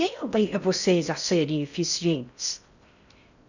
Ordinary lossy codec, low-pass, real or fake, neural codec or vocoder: none; 7.2 kHz; fake; codec, 16 kHz in and 24 kHz out, 0.6 kbps, FocalCodec, streaming, 4096 codes